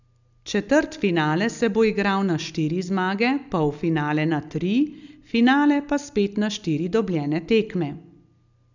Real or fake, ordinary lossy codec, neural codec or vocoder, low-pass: real; none; none; 7.2 kHz